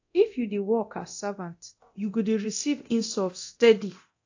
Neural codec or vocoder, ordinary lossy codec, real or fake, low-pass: codec, 24 kHz, 0.9 kbps, DualCodec; AAC, 48 kbps; fake; 7.2 kHz